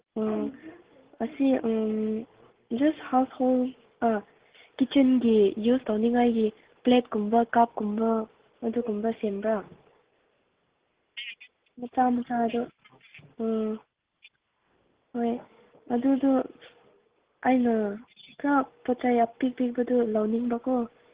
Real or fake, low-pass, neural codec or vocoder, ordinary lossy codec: real; 3.6 kHz; none; Opus, 16 kbps